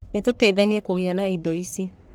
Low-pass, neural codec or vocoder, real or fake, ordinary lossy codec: none; codec, 44.1 kHz, 1.7 kbps, Pupu-Codec; fake; none